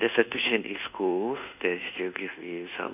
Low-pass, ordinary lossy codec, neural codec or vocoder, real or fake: 3.6 kHz; none; codec, 24 kHz, 1.2 kbps, DualCodec; fake